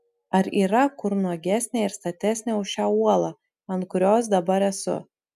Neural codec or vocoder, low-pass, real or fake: none; 14.4 kHz; real